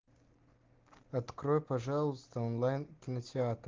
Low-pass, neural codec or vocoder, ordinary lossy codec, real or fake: 7.2 kHz; none; Opus, 16 kbps; real